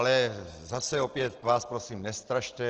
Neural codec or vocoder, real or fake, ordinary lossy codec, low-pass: none; real; Opus, 16 kbps; 7.2 kHz